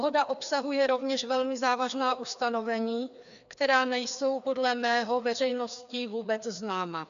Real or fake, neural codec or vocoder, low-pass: fake; codec, 16 kHz, 2 kbps, FreqCodec, larger model; 7.2 kHz